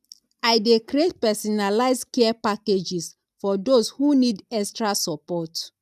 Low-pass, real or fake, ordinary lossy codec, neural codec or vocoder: 14.4 kHz; real; none; none